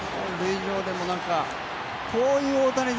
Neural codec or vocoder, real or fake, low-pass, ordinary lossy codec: none; real; none; none